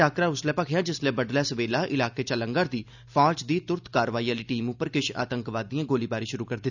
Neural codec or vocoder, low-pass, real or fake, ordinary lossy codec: none; 7.2 kHz; real; none